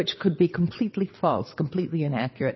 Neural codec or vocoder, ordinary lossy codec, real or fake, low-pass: vocoder, 22.05 kHz, 80 mel bands, WaveNeXt; MP3, 24 kbps; fake; 7.2 kHz